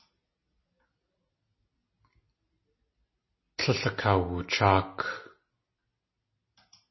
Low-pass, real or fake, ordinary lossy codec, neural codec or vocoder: 7.2 kHz; real; MP3, 24 kbps; none